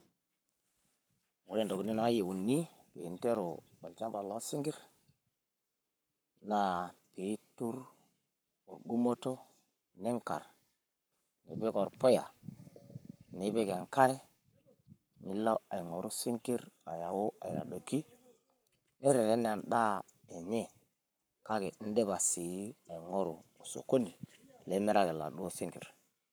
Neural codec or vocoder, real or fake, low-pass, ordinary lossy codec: codec, 44.1 kHz, 7.8 kbps, Pupu-Codec; fake; none; none